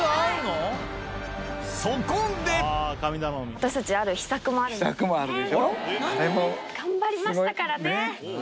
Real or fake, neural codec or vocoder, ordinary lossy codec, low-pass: real; none; none; none